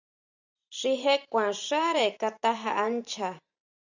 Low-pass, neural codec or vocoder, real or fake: 7.2 kHz; vocoder, 44.1 kHz, 128 mel bands every 512 samples, BigVGAN v2; fake